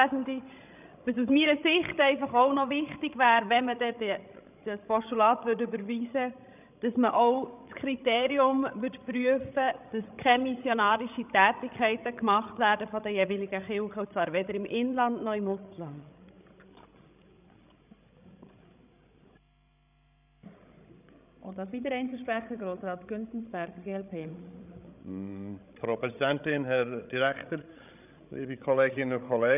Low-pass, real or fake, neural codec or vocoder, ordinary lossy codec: 3.6 kHz; fake; codec, 16 kHz, 16 kbps, FreqCodec, larger model; none